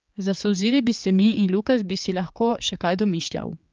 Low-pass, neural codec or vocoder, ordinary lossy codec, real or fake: 7.2 kHz; codec, 16 kHz, 4 kbps, X-Codec, HuBERT features, trained on general audio; Opus, 24 kbps; fake